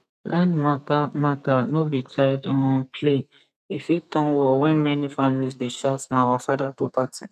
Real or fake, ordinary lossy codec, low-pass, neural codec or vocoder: fake; none; 14.4 kHz; codec, 32 kHz, 1.9 kbps, SNAC